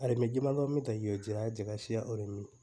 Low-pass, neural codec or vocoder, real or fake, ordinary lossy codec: none; none; real; none